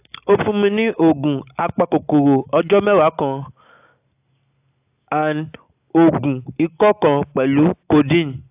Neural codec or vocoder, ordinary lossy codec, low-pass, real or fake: none; AAC, 32 kbps; 3.6 kHz; real